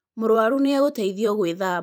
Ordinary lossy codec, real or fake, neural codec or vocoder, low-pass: none; fake; vocoder, 44.1 kHz, 128 mel bands every 512 samples, BigVGAN v2; 19.8 kHz